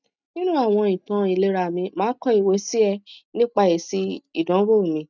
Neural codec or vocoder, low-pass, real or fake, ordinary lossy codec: none; 7.2 kHz; real; none